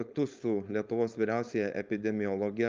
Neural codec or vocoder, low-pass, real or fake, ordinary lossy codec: codec, 16 kHz, 4.8 kbps, FACodec; 7.2 kHz; fake; Opus, 24 kbps